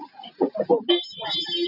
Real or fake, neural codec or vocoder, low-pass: real; none; 5.4 kHz